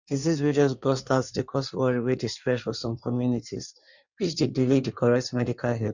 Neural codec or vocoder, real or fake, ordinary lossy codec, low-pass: codec, 16 kHz in and 24 kHz out, 1.1 kbps, FireRedTTS-2 codec; fake; none; 7.2 kHz